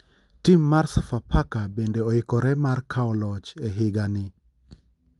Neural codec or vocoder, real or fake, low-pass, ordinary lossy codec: none; real; 10.8 kHz; Opus, 32 kbps